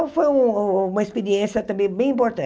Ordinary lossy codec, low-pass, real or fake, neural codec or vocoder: none; none; real; none